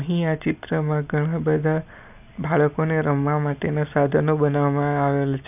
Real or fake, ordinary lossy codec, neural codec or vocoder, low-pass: real; none; none; 3.6 kHz